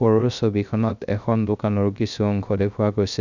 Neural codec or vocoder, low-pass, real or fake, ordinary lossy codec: codec, 16 kHz, 0.3 kbps, FocalCodec; 7.2 kHz; fake; none